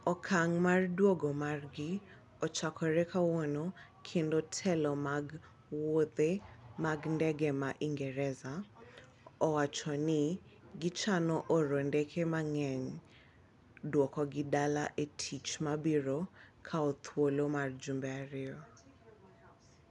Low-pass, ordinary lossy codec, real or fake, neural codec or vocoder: 10.8 kHz; none; real; none